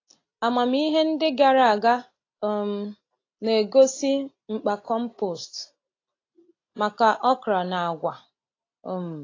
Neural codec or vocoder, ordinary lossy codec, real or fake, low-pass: none; AAC, 32 kbps; real; 7.2 kHz